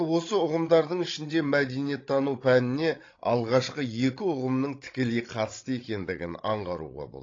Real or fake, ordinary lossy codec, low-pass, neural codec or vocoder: fake; AAC, 32 kbps; 7.2 kHz; codec, 16 kHz, 16 kbps, FreqCodec, larger model